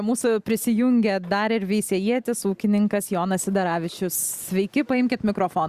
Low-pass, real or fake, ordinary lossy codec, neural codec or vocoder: 14.4 kHz; real; Opus, 64 kbps; none